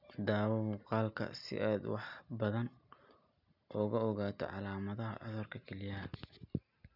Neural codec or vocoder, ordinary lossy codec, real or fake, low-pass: none; none; real; 5.4 kHz